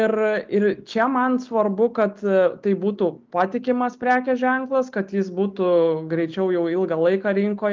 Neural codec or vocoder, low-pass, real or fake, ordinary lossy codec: none; 7.2 kHz; real; Opus, 24 kbps